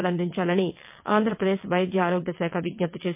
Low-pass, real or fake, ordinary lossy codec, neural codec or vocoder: 3.6 kHz; fake; MP3, 32 kbps; vocoder, 22.05 kHz, 80 mel bands, WaveNeXt